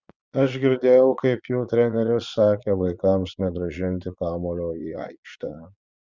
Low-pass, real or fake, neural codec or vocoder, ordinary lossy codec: 7.2 kHz; fake; vocoder, 22.05 kHz, 80 mel bands, Vocos; Opus, 64 kbps